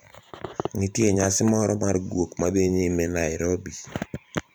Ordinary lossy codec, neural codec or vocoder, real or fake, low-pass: none; vocoder, 44.1 kHz, 128 mel bands every 512 samples, BigVGAN v2; fake; none